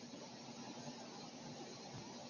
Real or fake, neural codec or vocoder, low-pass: real; none; 7.2 kHz